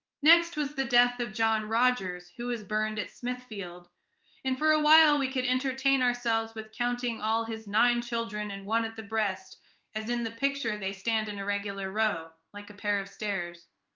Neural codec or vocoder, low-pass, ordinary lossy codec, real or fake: codec, 16 kHz in and 24 kHz out, 1 kbps, XY-Tokenizer; 7.2 kHz; Opus, 24 kbps; fake